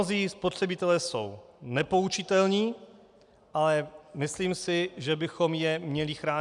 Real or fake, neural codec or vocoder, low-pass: real; none; 10.8 kHz